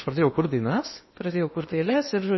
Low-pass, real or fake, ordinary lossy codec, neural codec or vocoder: 7.2 kHz; fake; MP3, 24 kbps; codec, 16 kHz in and 24 kHz out, 0.8 kbps, FocalCodec, streaming, 65536 codes